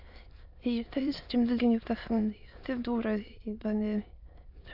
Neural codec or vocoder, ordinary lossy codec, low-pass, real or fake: autoencoder, 22.05 kHz, a latent of 192 numbers a frame, VITS, trained on many speakers; AAC, 48 kbps; 5.4 kHz; fake